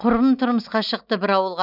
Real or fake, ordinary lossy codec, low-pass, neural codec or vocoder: real; none; 5.4 kHz; none